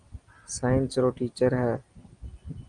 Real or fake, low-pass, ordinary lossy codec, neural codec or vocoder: real; 9.9 kHz; Opus, 24 kbps; none